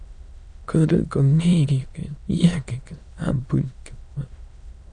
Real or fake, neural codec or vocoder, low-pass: fake; autoencoder, 22.05 kHz, a latent of 192 numbers a frame, VITS, trained on many speakers; 9.9 kHz